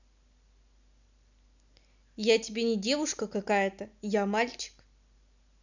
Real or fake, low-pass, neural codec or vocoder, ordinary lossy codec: real; 7.2 kHz; none; none